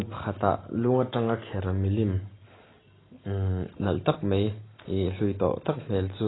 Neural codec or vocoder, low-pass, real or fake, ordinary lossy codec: none; 7.2 kHz; real; AAC, 16 kbps